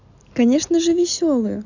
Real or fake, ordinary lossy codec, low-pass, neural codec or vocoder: real; none; 7.2 kHz; none